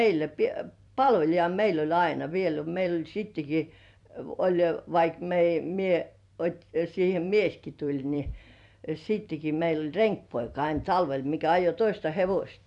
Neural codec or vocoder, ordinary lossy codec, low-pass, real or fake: vocoder, 44.1 kHz, 128 mel bands every 256 samples, BigVGAN v2; none; 10.8 kHz; fake